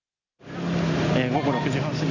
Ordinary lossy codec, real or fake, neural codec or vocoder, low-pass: none; real; none; 7.2 kHz